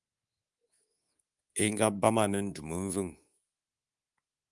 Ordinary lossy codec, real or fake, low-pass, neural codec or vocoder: Opus, 32 kbps; fake; 10.8 kHz; codec, 24 kHz, 3.1 kbps, DualCodec